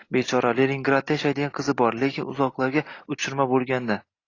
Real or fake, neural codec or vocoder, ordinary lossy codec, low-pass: real; none; AAC, 32 kbps; 7.2 kHz